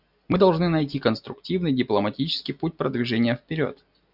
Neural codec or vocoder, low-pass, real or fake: none; 5.4 kHz; real